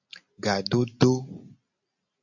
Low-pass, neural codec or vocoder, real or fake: 7.2 kHz; none; real